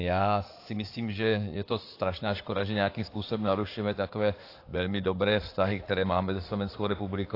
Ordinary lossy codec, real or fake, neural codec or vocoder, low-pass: AAC, 32 kbps; fake; codec, 16 kHz in and 24 kHz out, 2.2 kbps, FireRedTTS-2 codec; 5.4 kHz